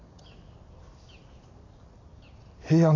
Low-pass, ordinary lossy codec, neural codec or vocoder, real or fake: 7.2 kHz; AAC, 32 kbps; none; real